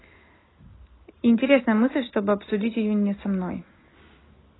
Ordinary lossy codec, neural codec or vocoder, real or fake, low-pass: AAC, 16 kbps; none; real; 7.2 kHz